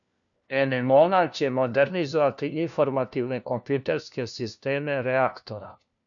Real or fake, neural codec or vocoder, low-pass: fake; codec, 16 kHz, 1 kbps, FunCodec, trained on LibriTTS, 50 frames a second; 7.2 kHz